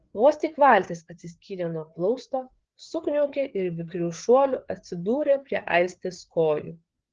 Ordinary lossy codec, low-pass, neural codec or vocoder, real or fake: Opus, 16 kbps; 7.2 kHz; codec, 16 kHz, 4 kbps, FreqCodec, larger model; fake